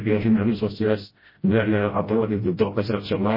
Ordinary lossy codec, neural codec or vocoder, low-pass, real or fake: MP3, 24 kbps; codec, 16 kHz, 0.5 kbps, FreqCodec, smaller model; 5.4 kHz; fake